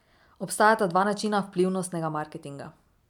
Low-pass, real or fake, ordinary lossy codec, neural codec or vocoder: 19.8 kHz; real; none; none